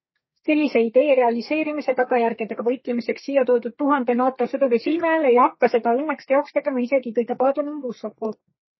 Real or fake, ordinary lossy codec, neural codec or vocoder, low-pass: fake; MP3, 24 kbps; codec, 32 kHz, 1.9 kbps, SNAC; 7.2 kHz